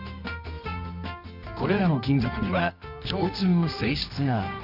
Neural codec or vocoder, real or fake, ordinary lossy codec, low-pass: codec, 24 kHz, 0.9 kbps, WavTokenizer, medium music audio release; fake; none; 5.4 kHz